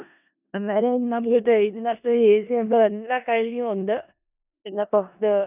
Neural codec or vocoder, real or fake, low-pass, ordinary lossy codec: codec, 16 kHz in and 24 kHz out, 0.4 kbps, LongCat-Audio-Codec, four codebook decoder; fake; 3.6 kHz; none